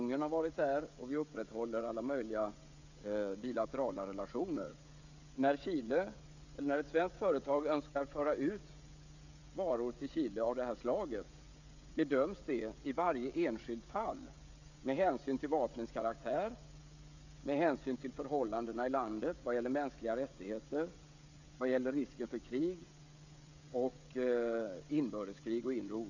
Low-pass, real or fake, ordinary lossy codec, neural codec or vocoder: 7.2 kHz; fake; none; codec, 16 kHz, 16 kbps, FreqCodec, smaller model